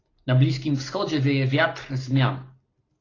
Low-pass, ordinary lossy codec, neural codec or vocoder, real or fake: 7.2 kHz; AAC, 32 kbps; none; real